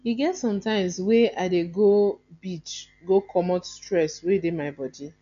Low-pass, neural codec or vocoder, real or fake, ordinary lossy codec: 7.2 kHz; none; real; none